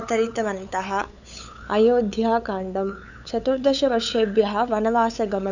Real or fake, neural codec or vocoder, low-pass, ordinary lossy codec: fake; codec, 16 kHz, 8 kbps, FunCodec, trained on LibriTTS, 25 frames a second; 7.2 kHz; none